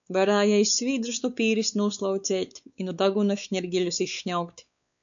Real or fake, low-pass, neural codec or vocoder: fake; 7.2 kHz; codec, 16 kHz, 4 kbps, X-Codec, WavLM features, trained on Multilingual LibriSpeech